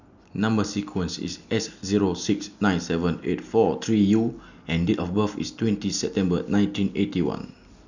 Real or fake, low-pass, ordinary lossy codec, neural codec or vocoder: real; 7.2 kHz; none; none